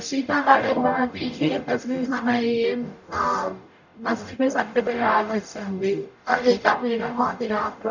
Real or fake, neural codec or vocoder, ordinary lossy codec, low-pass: fake; codec, 44.1 kHz, 0.9 kbps, DAC; none; 7.2 kHz